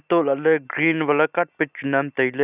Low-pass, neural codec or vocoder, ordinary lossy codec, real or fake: 3.6 kHz; none; none; real